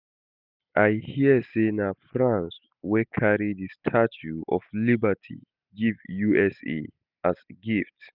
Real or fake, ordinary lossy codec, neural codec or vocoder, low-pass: real; none; none; 5.4 kHz